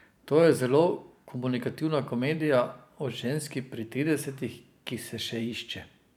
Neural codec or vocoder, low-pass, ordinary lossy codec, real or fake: vocoder, 44.1 kHz, 128 mel bands every 512 samples, BigVGAN v2; 19.8 kHz; none; fake